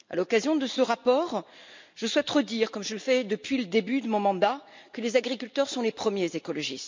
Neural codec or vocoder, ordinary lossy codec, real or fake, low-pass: none; MP3, 64 kbps; real; 7.2 kHz